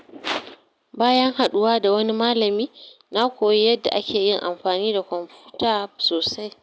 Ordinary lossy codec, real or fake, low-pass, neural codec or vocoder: none; real; none; none